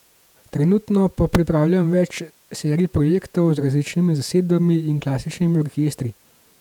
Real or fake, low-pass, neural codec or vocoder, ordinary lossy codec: fake; 19.8 kHz; vocoder, 48 kHz, 128 mel bands, Vocos; none